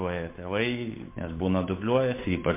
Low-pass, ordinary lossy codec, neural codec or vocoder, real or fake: 3.6 kHz; MP3, 24 kbps; codec, 16 kHz, 8 kbps, FunCodec, trained on Chinese and English, 25 frames a second; fake